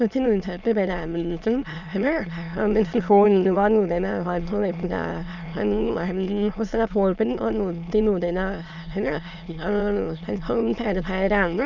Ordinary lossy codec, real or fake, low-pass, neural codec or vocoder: none; fake; 7.2 kHz; autoencoder, 22.05 kHz, a latent of 192 numbers a frame, VITS, trained on many speakers